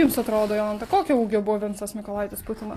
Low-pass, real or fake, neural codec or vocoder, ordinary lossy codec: 14.4 kHz; real; none; AAC, 48 kbps